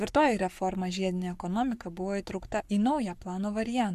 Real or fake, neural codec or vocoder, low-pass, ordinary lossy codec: fake; codec, 44.1 kHz, 7.8 kbps, Pupu-Codec; 14.4 kHz; AAC, 96 kbps